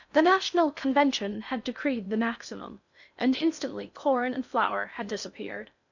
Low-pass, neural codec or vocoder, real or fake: 7.2 kHz; codec, 16 kHz in and 24 kHz out, 0.6 kbps, FocalCodec, streaming, 4096 codes; fake